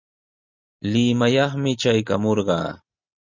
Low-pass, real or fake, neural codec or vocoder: 7.2 kHz; real; none